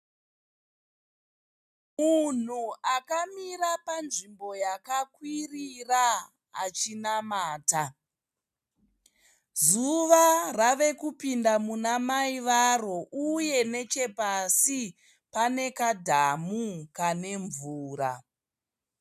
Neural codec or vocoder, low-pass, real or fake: none; 14.4 kHz; real